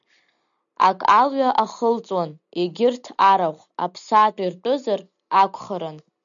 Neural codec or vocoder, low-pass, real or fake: none; 7.2 kHz; real